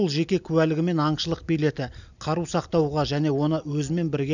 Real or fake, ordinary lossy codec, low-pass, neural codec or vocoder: real; none; 7.2 kHz; none